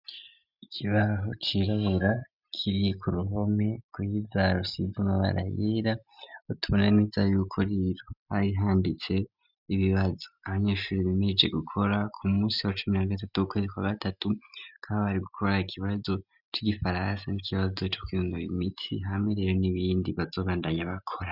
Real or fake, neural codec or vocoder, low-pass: real; none; 5.4 kHz